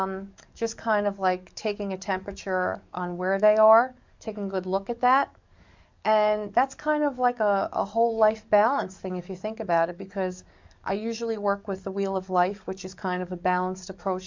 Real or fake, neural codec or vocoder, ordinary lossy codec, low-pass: fake; codec, 44.1 kHz, 7.8 kbps, DAC; MP3, 64 kbps; 7.2 kHz